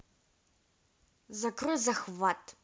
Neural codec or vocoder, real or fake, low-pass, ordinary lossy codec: none; real; none; none